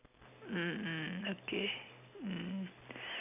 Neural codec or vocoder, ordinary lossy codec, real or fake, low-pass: none; none; real; 3.6 kHz